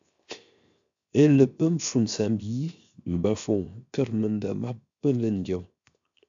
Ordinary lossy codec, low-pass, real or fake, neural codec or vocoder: AAC, 64 kbps; 7.2 kHz; fake; codec, 16 kHz, 0.7 kbps, FocalCodec